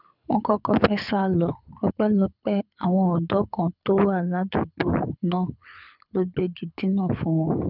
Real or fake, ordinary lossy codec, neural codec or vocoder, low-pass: fake; none; codec, 24 kHz, 6 kbps, HILCodec; 5.4 kHz